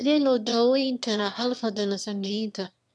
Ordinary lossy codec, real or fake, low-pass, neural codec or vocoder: none; fake; none; autoencoder, 22.05 kHz, a latent of 192 numbers a frame, VITS, trained on one speaker